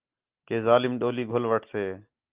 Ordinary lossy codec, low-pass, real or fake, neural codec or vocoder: Opus, 24 kbps; 3.6 kHz; real; none